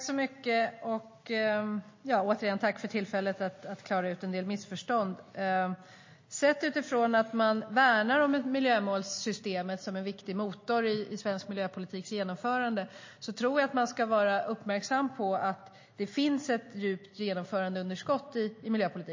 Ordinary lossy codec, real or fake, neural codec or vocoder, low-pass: MP3, 32 kbps; real; none; 7.2 kHz